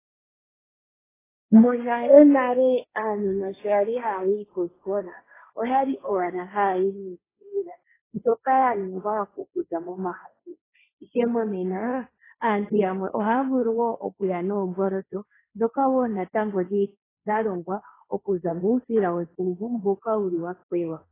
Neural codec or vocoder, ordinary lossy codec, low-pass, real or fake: codec, 16 kHz, 1.1 kbps, Voila-Tokenizer; AAC, 16 kbps; 3.6 kHz; fake